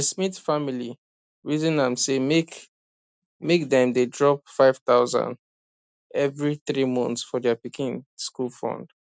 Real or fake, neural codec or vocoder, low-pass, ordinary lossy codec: real; none; none; none